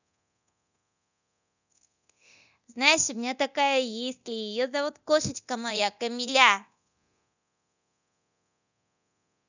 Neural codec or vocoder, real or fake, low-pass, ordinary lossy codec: codec, 24 kHz, 0.9 kbps, DualCodec; fake; 7.2 kHz; none